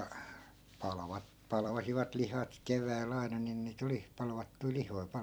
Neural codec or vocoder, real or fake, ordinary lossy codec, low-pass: vocoder, 44.1 kHz, 128 mel bands every 256 samples, BigVGAN v2; fake; none; none